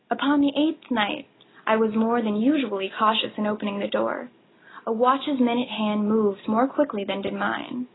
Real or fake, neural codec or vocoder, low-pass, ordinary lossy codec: real; none; 7.2 kHz; AAC, 16 kbps